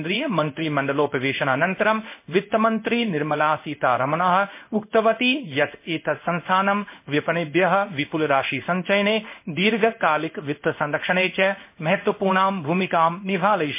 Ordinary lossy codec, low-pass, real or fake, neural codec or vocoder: MP3, 24 kbps; 3.6 kHz; fake; codec, 16 kHz in and 24 kHz out, 1 kbps, XY-Tokenizer